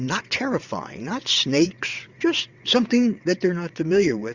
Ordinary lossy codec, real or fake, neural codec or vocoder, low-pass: Opus, 64 kbps; fake; vocoder, 22.05 kHz, 80 mel bands, WaveNeXt; 7.2 kHz